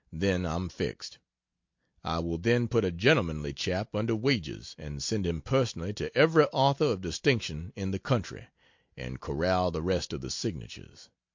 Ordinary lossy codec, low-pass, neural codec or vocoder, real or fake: MP3, 48 kbps; 7.2 kHz; none; real